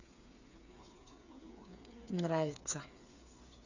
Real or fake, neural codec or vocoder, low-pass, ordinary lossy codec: fake; codec, 16 kHz, 8 kbps, FreqCodec, smaller model; 7.2 kHz; none